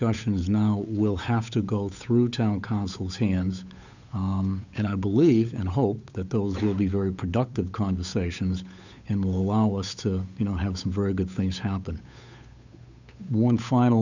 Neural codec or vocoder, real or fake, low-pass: codec, 16 kHz, 8 kbps, FunCodec, trained on Chinese and English, 25 frames a second; fake; 7.2 kHz